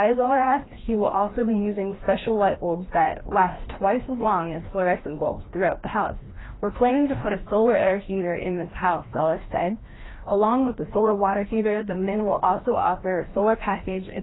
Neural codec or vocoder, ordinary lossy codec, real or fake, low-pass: codec, 16 kHz, 1 kbps, FreqCodec, larger model; AAC, 16 kbps; fake; 7.2 kHz